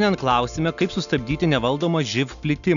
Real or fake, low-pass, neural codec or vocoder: real; 7.2 kHz; none